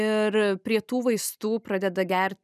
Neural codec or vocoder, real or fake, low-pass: none; real; 14.4 kHz